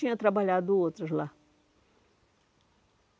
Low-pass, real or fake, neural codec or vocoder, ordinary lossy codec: none; real; none; none